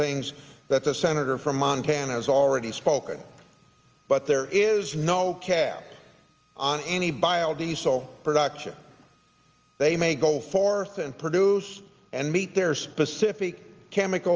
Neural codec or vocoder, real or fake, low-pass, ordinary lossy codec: none; real; 7.2 kHz; Opus, 24 kbps